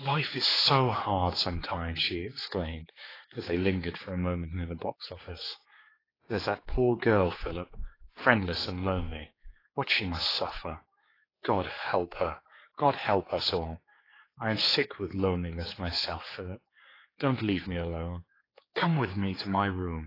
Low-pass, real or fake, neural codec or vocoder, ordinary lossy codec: 5.4 kHz; fake; codec, 16 kHz, 4 kbps, X-Codec, HuBERT features, trained on general audio; AAC, 24 kbps